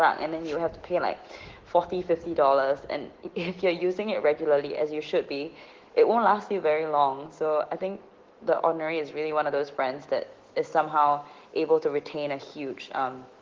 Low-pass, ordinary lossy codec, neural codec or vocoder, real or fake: 7.2 kHz; Opus, 16 kbps; autoencoder, 48 kHz, 128 numbers a frame, DAC-VAE, trained on Japanese speech; fake